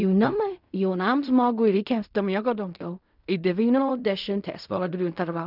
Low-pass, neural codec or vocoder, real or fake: 5.4 kHz; codec, 16 kHz in and 24 kHz out, 0.4 kbps, LongCat-Audio-Codec, fine tuned four codebook decoder; fake